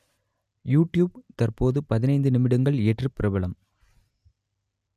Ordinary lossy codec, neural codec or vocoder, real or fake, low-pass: none; none; real; 14.4 kHz